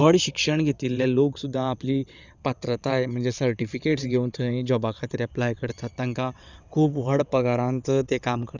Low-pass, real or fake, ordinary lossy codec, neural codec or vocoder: 7.2 kHz; fake; none; vocoder, 22.05 kHz, 80 mel bands, WaveNeXt